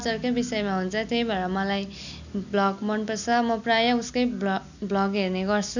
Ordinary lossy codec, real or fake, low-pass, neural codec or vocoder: none; real; 7.2 kHz; none